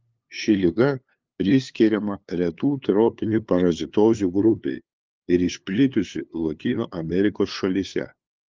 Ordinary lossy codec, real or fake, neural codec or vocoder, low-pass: Opus, 32 kbps; fake; codec, 16 kHz, 2 kbps, FunCodec, trained on LibriTTS, 25 frames a second; 7.2 kHz